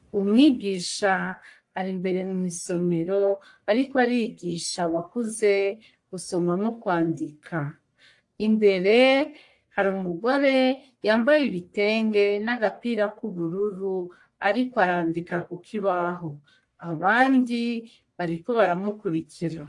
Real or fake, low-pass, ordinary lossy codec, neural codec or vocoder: fake; 10.8 kHz; AAC, 64 kbps; codec, 44.1 kHz, 1.7 kbps, Pupu-Codec